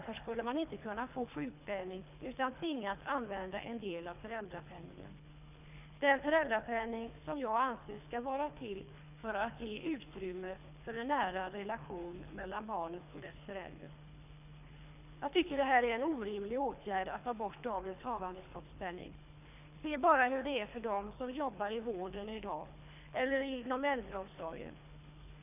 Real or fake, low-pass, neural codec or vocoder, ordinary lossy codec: fake; 3.6 kHz; codec, 24 kHz, 3 kbps, HILCodec; none